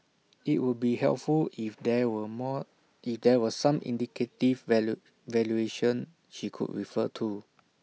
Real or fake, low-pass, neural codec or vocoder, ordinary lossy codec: real; none; none; none